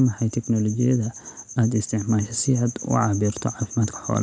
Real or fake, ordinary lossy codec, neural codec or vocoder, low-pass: real; none; none; none